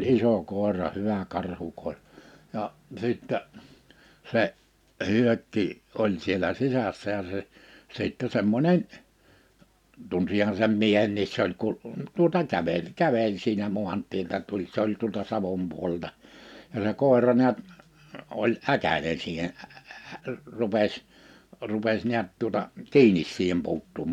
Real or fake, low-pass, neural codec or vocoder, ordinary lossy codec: real; 19.8 kHz; none; none